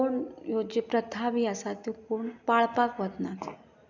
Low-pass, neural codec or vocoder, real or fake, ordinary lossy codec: 7.2 kHz; none; real; none